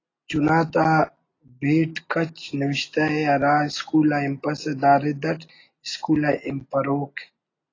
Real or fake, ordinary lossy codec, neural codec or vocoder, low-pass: real; AAC, 32 kbps; none; 7.2 kHz